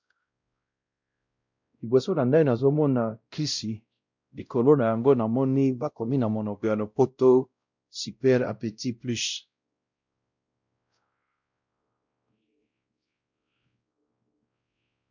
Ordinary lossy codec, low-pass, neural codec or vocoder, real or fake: MP3, 64 kbps; 7.2 kHz; codec, 16 kHz, 0.5 kbps, X-Codec, WavLM features, trained on Multilingual LibriSpeech; fake